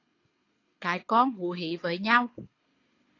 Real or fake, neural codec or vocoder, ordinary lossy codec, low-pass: fake; codec, 24 kHz, 6 kbps, HILCodec; AAC, 32 kbps; 7.2 kHz